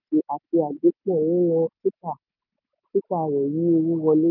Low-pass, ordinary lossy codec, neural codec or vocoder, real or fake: 5.4 kHz; none; none; real